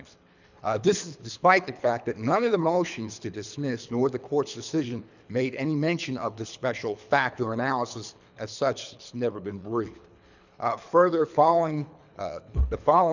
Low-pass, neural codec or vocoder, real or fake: 7.2 kHz; codec, 24 kHz, 3 kbps, HILCodec; fake